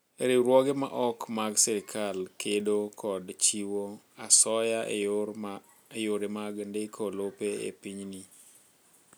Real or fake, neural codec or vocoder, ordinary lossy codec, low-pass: real; none; none; none